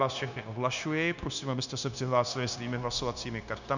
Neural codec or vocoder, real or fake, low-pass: codec, 16 kHz, 0.9 kbps, LongCat-Audio-Codec; fake; 7.2 kHz